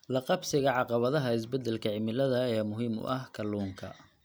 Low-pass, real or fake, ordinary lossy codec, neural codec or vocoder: none; real; none; none